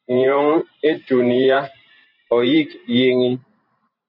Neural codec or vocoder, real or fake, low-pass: vocoder, 44.1 kHz, 128 mel bands every 512 samples, BigVGAN v2; fake; 5.4 kHz